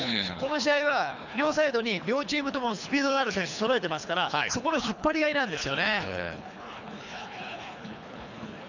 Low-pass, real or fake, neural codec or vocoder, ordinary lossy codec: 7.2 kHz; fake; codec, 24 kHz, 3 kbps, HILCodec; none